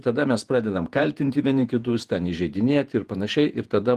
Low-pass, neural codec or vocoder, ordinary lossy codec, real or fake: 14.4 kHz; vocoder, 48 kHz, 128 mel bands, Vocos; Opus, 24 kbps; fake